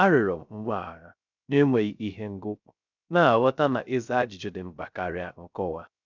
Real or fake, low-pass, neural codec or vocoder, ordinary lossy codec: fake; 7.2 kHz; codec, 16 kHz, 0.3 kbps, FocalCodec; none